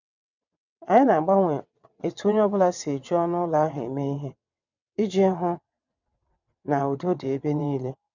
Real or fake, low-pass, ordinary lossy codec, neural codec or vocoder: fake; 7.2 kHz; none; vocoder, 22.05 kHz, 80 mel bands, WaveNeXt